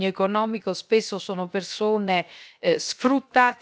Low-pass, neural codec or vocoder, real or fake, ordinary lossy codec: none; codec, 16 kHz, 0.7 kbps, FocalCodec; fake; none